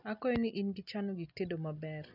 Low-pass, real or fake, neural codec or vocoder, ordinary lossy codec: 5.4 kHz; real; none; none